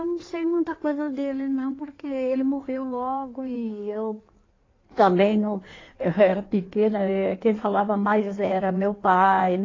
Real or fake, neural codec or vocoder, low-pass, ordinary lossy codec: fake; codec, 16 kHz in and 24 kHz out, 1.1 kbps, FireRedTTS-2 codec; 7.2 kHz; AAC, 32 kbps